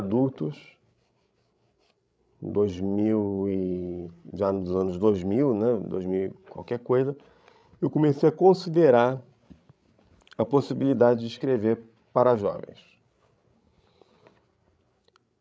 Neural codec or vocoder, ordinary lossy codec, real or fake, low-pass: codec, 16 kHz, 16 kbps, FreqCodec, larger model; none; fake; none